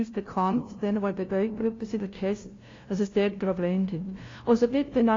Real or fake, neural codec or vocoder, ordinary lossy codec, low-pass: fake; codec, 16 kHz, 0.5 kbps, FunCodec, trained on LibriTTS, 25 frames a second; AAC, 32 kbps; 7.2 kHz